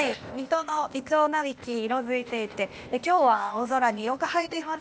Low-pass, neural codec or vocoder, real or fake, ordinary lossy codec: none; codec, 16 kHz, 0.8 kbps, ZipCodec; fake; none